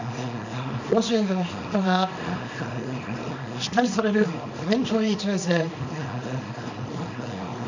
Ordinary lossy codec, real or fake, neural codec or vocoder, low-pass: none; fake; codec, 24 kHz, 0.9 kbps, WavTokenizer, small release; 7.2 kHz